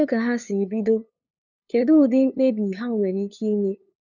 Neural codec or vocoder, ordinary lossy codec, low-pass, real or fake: codec, 16 kHz, 2 kbps, FunCodec, trained on LibriTTS, 25 frames a second; none; 7.2 kHz; fake